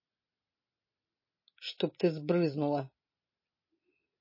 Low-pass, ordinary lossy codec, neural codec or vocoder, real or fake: 5.4 kHz; MP3, 24 kbps; none; real